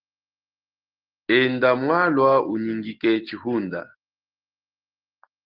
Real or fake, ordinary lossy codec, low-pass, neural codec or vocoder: real; Opus, 16 kbps; 5.4 kHz; none